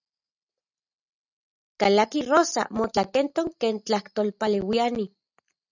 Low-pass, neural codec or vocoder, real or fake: 7.2 kHz; none; real